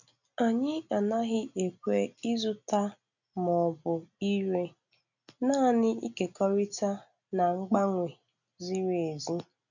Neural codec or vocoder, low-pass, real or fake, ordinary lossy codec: none; 7.2 kHz; real; none